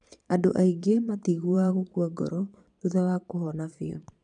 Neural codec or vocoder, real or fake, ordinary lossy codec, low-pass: vocoder, 22.05 kHz, 80 mel bands, Vocos; fake; none; 9.9 kHz